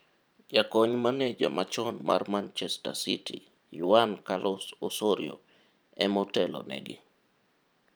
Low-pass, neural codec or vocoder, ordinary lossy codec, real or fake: none; vocoder, 44.1 kHz, 128 mel bands every 512 samples, BigVGAN v2; none; fake